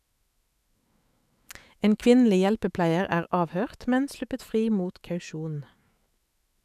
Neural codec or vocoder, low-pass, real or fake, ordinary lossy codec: autoencoder, 48 kHz, 128 numbers a frame, DAC-VAE, trained on Japanese speech; 14.4 kHz; fake; none